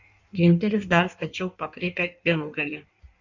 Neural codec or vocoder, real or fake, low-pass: codec, 16 kHz in and 24 kHz out, 1.1 kbps, FireRedTTS-2 codec; fake; 7.2 kHz